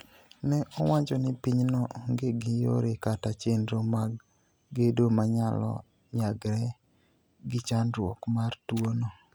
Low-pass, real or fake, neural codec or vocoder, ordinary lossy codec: none; real; none; none